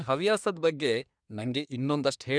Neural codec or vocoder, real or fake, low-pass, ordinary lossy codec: codec, 24 kHz, 1 kbps, SNAC; fake; 9.9 kHz; none